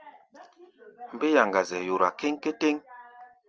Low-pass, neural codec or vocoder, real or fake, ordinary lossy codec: 7.2 kHz; none; real; Opus, 24 kbps